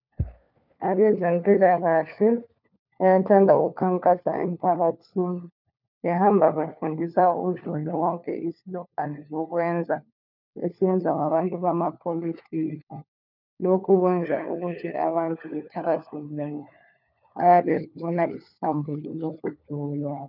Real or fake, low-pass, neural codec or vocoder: fake; 5.4 kHz; codec, 16 kHz, 4 kbps, FunCodec, trained on LibriTTS, 50 frames a second